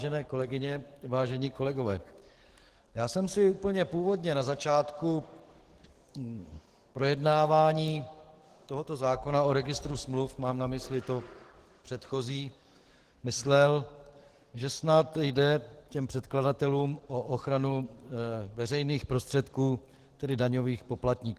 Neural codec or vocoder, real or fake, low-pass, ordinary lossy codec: codec, 44.1 kHz, 7.8 kbps, DAC; fake; 14.4 kHz; Opus, 16 kbps